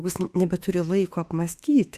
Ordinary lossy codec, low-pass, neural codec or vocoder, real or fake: Opus, 64 kbps; 14.4 kHz; autoencoder, 48 kHz, 32 numbers a frame, DAC-VAE, trained on Japanese speech; fake